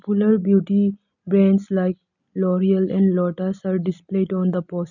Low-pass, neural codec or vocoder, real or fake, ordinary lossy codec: 7.2 kHz; none; real; none